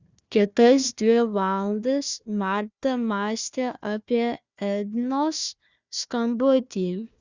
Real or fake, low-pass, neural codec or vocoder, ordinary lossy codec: fake; 7.2 kHz; codec, 16 kHz, 1 kbps, FunCodec, trained on Chinese and English, 50 frames a second; Opus, 64 kbps